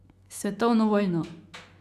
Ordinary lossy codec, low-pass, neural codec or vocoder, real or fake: Opus, 64 kbps; 14.4 kHz; autoencoder, 48 kHz, 128 numbers a frame, DAC-VAE, trained on Japanese speech; fake